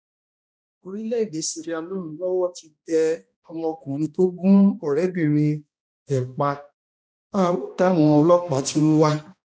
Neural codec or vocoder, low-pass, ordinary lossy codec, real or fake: codec, 16 kHz, 1 kbps, X-Codec, HuBERT features, trained on balanced general audio; none; none; fake